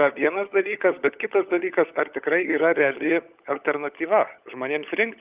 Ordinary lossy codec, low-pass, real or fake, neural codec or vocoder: Opus, 24 kbps; 3.6 kHz; fake; codec, 16 kHz, 16 kbps, FunCodec, trained on LibriTTS, 50 frames a second